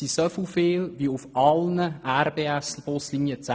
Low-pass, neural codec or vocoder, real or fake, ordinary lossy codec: none; none; real; none